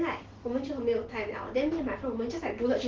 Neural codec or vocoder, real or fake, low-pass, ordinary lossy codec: none; real; 7.2 kHz; Opus, 16 kbps